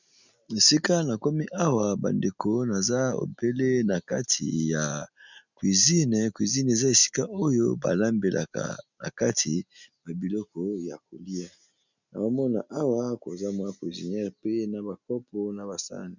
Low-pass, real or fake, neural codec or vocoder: 7.2 kHz; real; none